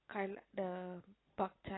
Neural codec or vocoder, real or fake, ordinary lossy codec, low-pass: none; real; AAC, 16 kbps; 7.2 kHz